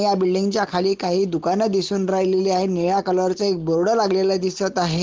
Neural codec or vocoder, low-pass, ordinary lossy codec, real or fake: none; 7.2 kHz; Opus, 16 kbps; real